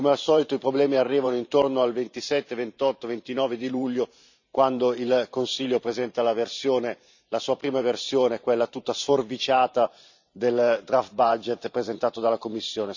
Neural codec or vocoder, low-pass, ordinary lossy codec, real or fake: vocoder, 44.1 kHz, 128 mel bands every 512 samples, BigVGAN v2; 7.2 kHz; none; fake